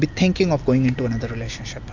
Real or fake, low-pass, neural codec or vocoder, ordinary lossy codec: real; 7.2 kHz; none; AAC, 48 kbps